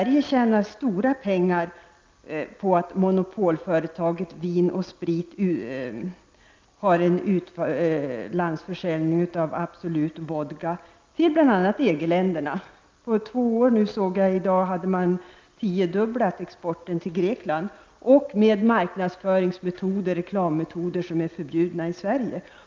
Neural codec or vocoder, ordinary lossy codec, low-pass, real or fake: none; Opus, 32 kbps; 7.2 kHz; real